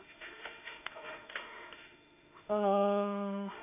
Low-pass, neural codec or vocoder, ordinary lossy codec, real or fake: 3.6 kHz; autoencoder, 48 kHz, 32 numbers a frame, DAC-VAE, trained on Japanese speech; AAC, 32 kbps; fake